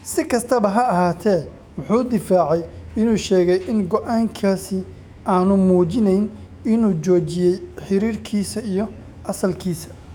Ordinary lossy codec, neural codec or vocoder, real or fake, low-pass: none; autoencoder, 48 kHz, 128 numbers a frame, DAC-VAE, trained on Japanese speech; fake; 19.8 kHz